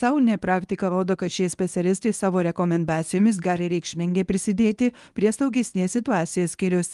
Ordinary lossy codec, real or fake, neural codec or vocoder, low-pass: Opus, 32 kbps; fake; codec, 24 kHz, 0.9 kbps, WavTokenizer, medium speech release version 1; 10.8 kHz